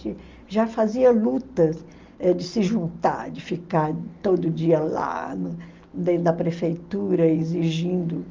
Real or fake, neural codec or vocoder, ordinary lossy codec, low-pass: real; none; Opus, 32 kbps; 7.2 kHz